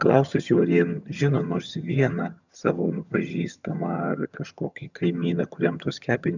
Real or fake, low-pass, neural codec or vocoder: fake; 7.2 kHz; vocoder, 22.05 kHz, 80 mel bands, HiFi-GAN